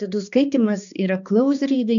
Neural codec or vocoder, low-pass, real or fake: codec, 16 kHz, 2 kbps, X-Codec, HuBERT features, trained on balanced general audio; 7.2 kHz; fake